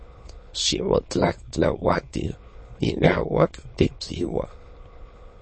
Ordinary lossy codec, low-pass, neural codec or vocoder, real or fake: MP3, 32 kbps; 9.9 kHz; autoencoder, 22.05 kHz, a latent of 192 numbers a frame, VITS, trained on many speakers; fake